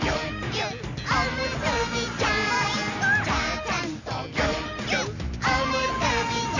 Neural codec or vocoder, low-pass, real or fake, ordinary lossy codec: none; 7.2 kHz; real; none